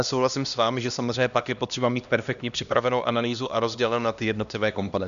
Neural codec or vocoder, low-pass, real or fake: codec, 16 kHz, 1 kbps, X-Codec, HuBERT features, trained on LibriSpeech; 7.2 kHz; fake